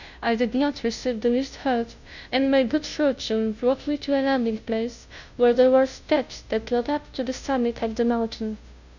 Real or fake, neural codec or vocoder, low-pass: fake; codec, 16 kHz, 0.5 kbps, FunCodec, trained on Chinese and English, 25 frames a second; 7.2 kHz